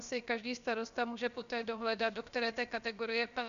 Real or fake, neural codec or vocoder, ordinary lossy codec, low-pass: fake; codec, 16 kHz, about 1 kbps, DyCAST, with the encoder's durations; MP3, 96 kbps; 7.2 kHz